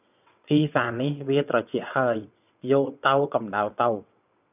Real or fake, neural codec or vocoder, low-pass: fake; vocoder, 22.05 kHz, 80 mel bands, WaveNeXt; 3.6 kHz